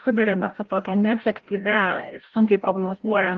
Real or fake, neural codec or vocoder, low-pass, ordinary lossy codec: fake; codec, 16 kHz, 0.5 kbps, FreqCodec, larger model; 7.2 kHz; Opus, 16 kbps